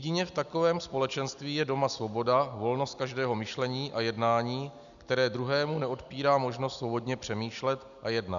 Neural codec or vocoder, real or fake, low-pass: none; real; 7.2 kHz